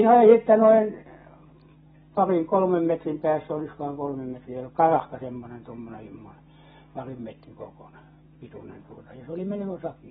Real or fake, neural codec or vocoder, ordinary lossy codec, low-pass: real; none; AAC, 16 kbps; 7.2 kHz